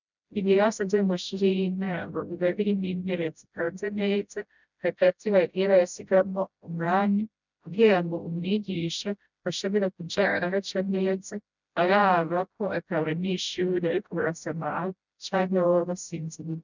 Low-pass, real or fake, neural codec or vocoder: 7.2 kHz; fake; codec, 16 kHz, 0.5 kbps, FreqCodec, smaller model